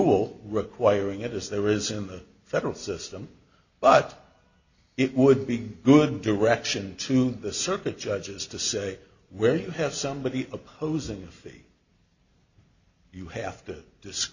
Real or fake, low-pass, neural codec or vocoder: real; 7.2 kHz; none